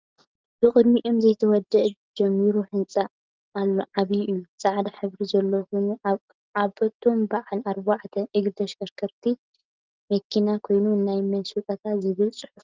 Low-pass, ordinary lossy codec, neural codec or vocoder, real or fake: 7.2 kHz; Opus, 32 kbps; none; real